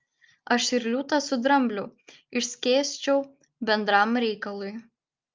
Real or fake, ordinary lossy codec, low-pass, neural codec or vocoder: real; Opus, 24 kbps; 7.2 kHz; none